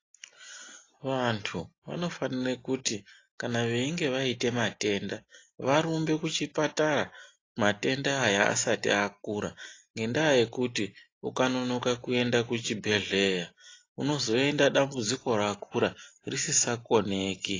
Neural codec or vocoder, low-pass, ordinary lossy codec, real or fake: none; 7.2 kHz; AAC, 32 kbps; real